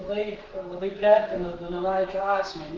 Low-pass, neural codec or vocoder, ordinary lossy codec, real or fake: 7.2 kHz; codec, 32 kHz, 1.9 kbps, SNAC; Opus, 32 kbps; fake